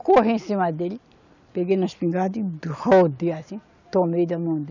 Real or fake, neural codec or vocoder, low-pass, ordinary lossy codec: real; none; 7.2 kHz; none